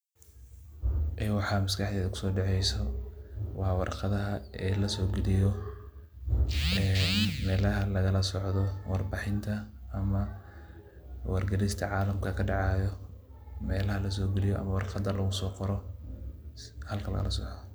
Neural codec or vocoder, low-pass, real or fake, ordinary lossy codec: none; none; real; none